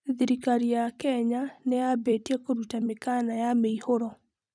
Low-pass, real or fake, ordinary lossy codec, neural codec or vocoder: 9.9 kHz; fake; none; vocoder, 44.1 kHz, 128 mel bands every 256 samples, BigVGAN v2